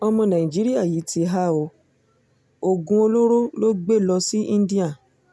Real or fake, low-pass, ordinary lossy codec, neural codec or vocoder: real; none; none; none